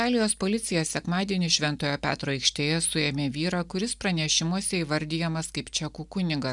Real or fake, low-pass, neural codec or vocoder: real; 10.8 kHz; none